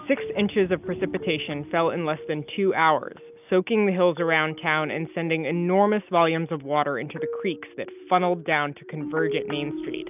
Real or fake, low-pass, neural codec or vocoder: real; 3.6 kHz; none